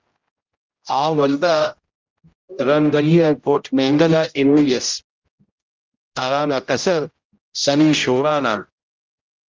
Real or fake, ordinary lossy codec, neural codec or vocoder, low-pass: fake; Opus, 32 kbps; codec, 16 kHz, 0.5 kbps, X-Codec, HuBERT features, trained on general audio; 7.2 kHz